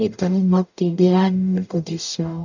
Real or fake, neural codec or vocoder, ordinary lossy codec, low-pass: fake; codec, 44.1 kHz, 0.9 kbps, DAC; none; 7.2 kHz